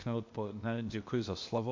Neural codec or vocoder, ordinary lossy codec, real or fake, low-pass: codec, 16 kHz, 0.8 kbps, ZipCodec; MP3, 48 kbps; fake; 7.2 kHz